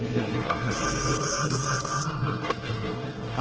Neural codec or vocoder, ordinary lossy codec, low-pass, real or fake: codec, 24 kHz, 1 kbps, SNAC; Opus, 16 kbps; 7.2 kHz; fake